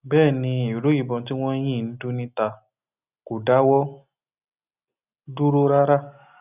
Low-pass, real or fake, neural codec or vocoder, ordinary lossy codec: 3.6 kHz; real; none; none